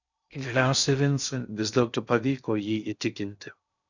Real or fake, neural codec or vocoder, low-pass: fake; codec, 16 kHz in and 24 kHz out, 0.6 kbps, FocalCodec, streaming, 2048 codes; 7.2 kHz